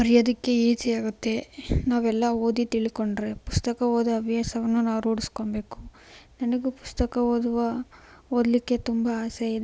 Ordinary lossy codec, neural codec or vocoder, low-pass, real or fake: none; none; none; real